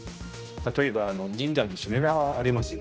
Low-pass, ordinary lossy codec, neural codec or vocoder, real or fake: none; none; codec, 16 kHz, 1 kbps, X-Codec, HuBERT features, trained on general audio; fake